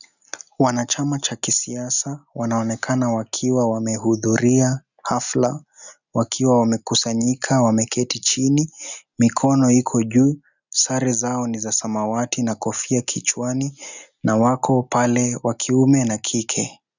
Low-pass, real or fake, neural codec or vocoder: 7.2 kHz; real; none